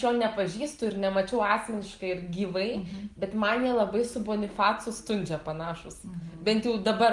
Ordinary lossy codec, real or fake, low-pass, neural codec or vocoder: Opus, 24 kbps; real; 10.8 kHz; none